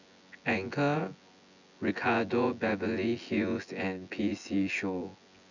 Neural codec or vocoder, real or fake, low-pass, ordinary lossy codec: vocoder, 24 kHz, 100 mel bands, Vocos; fake; 7.2 kHz; none